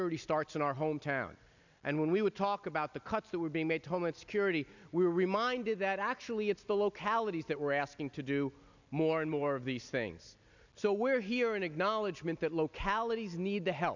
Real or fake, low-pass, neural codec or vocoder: real; 7.2 kHz; none